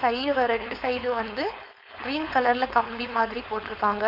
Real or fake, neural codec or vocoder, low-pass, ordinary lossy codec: fake; codec, 16 kHz, 4.8 kbps, FACodec; 5.4 kHz; none